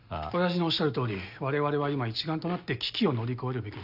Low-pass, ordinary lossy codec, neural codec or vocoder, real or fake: 5.4 kHz; none; none; real